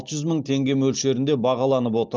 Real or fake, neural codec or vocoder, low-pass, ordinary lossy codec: real; none; 7.2 kHz; Opus, 24 kbps